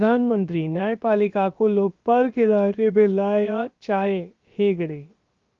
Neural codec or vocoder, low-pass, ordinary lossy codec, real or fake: codec, 16 kHz, 0.7 kbps, FocalCodec; 7.2 kHz; Opus, 32 kbps; fake